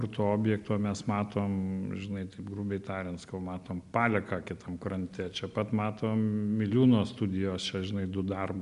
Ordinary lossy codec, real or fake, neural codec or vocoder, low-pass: MP3, 96 kbps; real; none; 10.8 kHz